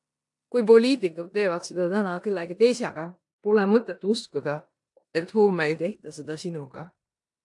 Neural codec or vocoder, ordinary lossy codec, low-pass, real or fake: codec, 16 kHz in and 24 kHz out, 0.9 kbps, LongCat-Audio-Codec, four codebook decoder; AAC, 64 kbps; 10.8 kHz; fake